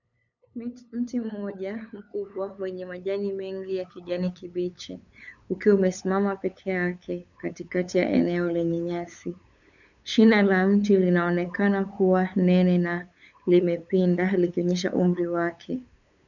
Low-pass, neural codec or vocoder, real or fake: 7.2 kHz; codec, 16 kHz, 8 kbps, FunCodec, trained on LibriTTS, 25 frames a second; fake